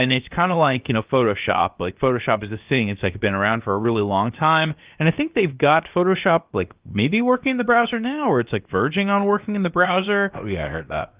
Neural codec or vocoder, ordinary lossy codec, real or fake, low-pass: codec, 16 kHz, 0.7 kbps, FocalCodec; Opus, 32 kbps; fake; 3.6 kHz